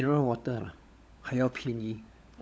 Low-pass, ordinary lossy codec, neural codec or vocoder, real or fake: none; none; codec, 16 kHz, 16 kbps, FunCodec, trained on LibriTTS, 50 frames a second; fake